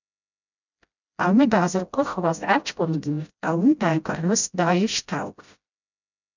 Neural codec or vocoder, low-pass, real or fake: codec, 16 kHz, 0.5 kbps, FreqCodec, smaller model; 7.2 kHz; fake